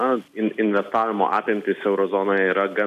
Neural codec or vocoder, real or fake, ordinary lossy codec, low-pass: none; real; AAC, 96 kbps; 14.4 kHz